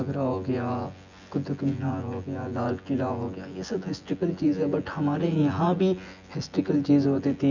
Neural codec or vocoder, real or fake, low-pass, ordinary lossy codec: vocoder, 24 kHz, 100 mel bands, Vocos; fake; 7.2 kHz; none